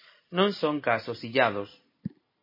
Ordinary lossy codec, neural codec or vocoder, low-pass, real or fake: MP3, 24 kbps; none; 5.4 kHz; real